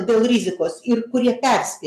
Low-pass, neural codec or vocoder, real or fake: 14.4 kHz; none; real